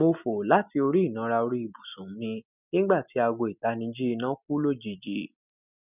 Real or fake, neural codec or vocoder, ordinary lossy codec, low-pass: real; none; none; 3.6 kHz